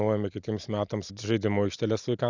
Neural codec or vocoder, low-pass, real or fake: none; 7.2 kHz; real